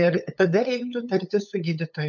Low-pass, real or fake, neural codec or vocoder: 7.2 kHz; fake; codec, 16 kHz, 8 kbps, FunCodec, trained on LibriTTS, 25 frames a second